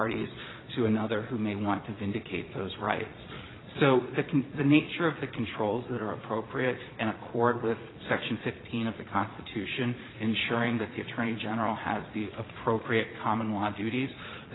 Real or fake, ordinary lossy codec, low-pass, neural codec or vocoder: fake; AAC, 16 kbps; 7.2 kHz; vocoder, 22.05 kHz, 80 mel bands, Vocos